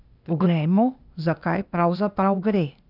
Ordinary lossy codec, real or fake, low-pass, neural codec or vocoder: none; fake; 5.4 kHz; codec, 16 kHz, 0.8 kbps, ZipCodec